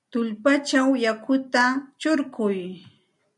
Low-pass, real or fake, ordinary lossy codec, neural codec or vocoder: 10.8 kHz; real; MP3, 96 kbps; none